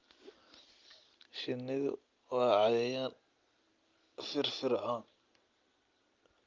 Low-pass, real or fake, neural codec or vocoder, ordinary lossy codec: 7.2 kHz; real; none; Opus, 32 kbps